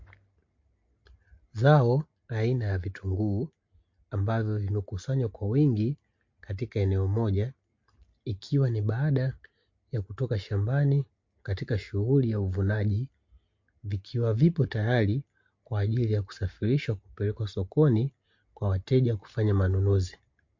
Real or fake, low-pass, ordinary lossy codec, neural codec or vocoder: real; 7.2 kHz; MP3, 48 kbps; none